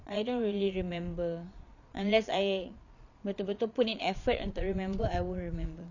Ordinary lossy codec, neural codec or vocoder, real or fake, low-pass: none; vocoder, 22.05 kHz, 80 mel bands, Vocos; fake; 7.2 kHz